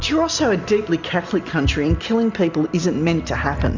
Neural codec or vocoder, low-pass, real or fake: none; 7.2 kHz; real